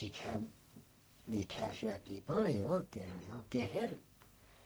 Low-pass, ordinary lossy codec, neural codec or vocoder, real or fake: none; none; codec, 44.1 kHz, 1.7 kbps, Pupu-Codec; fake